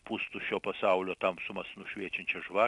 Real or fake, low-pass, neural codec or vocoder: real; 10.8 kHz; none